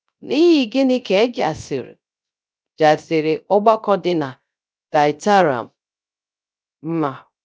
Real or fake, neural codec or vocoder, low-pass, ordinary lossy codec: fake; codec, 16 kHz, 0.3 kbps, FocalCodec; none; none